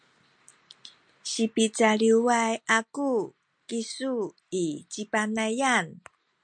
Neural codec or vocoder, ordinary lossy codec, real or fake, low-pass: none; MP3, 64 kbps; real; 9.9 kHz